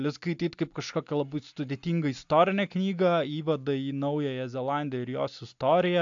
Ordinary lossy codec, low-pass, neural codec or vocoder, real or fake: AAC, 64 kbps; 7.2 kHz; none; real